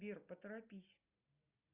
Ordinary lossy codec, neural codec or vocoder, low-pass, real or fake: Opus, 64 kbps; none; 3.6 kHz; real